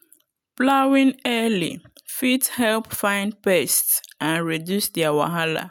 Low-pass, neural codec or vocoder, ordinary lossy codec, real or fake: none; none; none; real